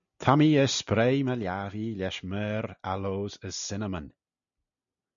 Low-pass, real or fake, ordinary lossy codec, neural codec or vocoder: 7.2 kHz; real; MP3, 96 kbps; none